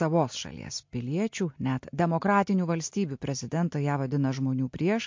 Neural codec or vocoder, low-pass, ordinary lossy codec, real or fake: none; 7.2 kHz; MP3, 48 kbps; real